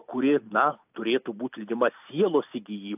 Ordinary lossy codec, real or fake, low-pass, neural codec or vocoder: AAC, 32 kbps; real; 3.6 kHz; none